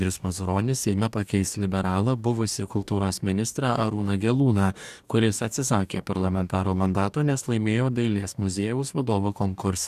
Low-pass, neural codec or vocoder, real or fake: 14.4 kHz; codec, 44.1 kHz, 2.6 kbps, DAC; fake